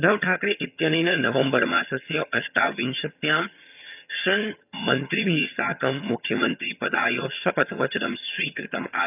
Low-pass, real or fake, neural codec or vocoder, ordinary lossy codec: 3.6 kHz; fake; vocoder, 22.05 kHz, 80 mel bands, HiFi-GAN; none